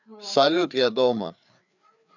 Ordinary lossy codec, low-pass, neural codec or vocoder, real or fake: none; 7.2 kHz; codec, 16 kHz, 4 kbps, FreqCodec, larger model; fake